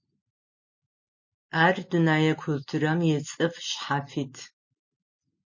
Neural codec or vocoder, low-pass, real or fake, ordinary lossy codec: none; 7.2 kHz; real; MP3, 32 kbps